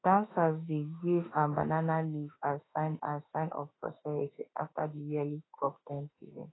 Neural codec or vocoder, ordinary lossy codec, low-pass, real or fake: autoencoder, 48 kHz, 32 numbers a frame, DAC-VAE, trained on Japanese speech; AAC, 16 kbps; 7.2 kHz; fake